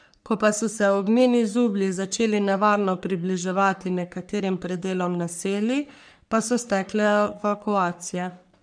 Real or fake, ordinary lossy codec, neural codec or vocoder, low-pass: fake; none; codec, 44.1 kHz, 3.4 kbps, Pupu-Codec; 9.9 kHz